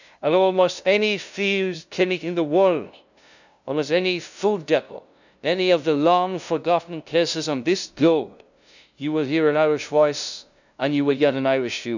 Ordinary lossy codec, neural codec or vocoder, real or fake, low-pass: none; codec, 16 kHz, 0.5 kbps, FunCodec, trained on LibriTTS, 25 frames a second; fake; 7.2 kHz